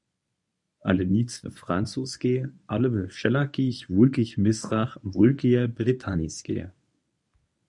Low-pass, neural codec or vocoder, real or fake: 10.8 kHz; codec, 24 kHz, 0.9 kbps, WavTokenizer, medium speech release version 1; fake